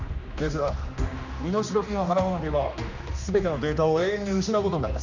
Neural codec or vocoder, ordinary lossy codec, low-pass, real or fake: codec, 16 kHz, 2 kbps, X-Codec, HuBERT features, trained on general audio; none; 7.2 kHz; fake